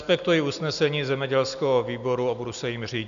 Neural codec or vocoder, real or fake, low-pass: none; real; 7.2 kHz